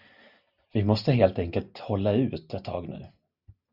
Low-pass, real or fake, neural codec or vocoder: 5.4 kHz; real; none